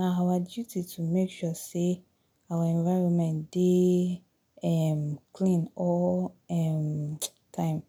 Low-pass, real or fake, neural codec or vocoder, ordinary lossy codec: 19.8 kHz; real; none; none